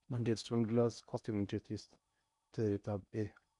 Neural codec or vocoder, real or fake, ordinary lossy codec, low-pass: codec, 16 kHz in and 24 kHz out, 0.6 kbps, FocalCodec, streaming, 4096 codes; fake; none; 10.8 kHz